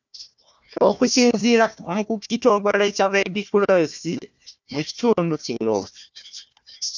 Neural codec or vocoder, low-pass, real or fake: codec, 16 kHz, 1 kbps, FunCodec, trained on Chinese and English, 50 frames a second; 7.2 kHz; fake